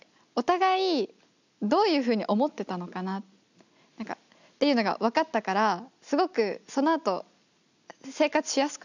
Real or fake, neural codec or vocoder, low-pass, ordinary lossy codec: real; none; 7.2 kHz; none